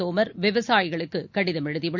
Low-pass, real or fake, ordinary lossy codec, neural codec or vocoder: 7.2 kHz; real; none; none